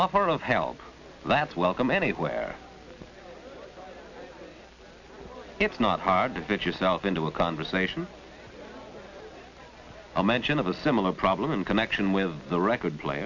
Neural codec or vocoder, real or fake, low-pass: none; real; 7.2 kHz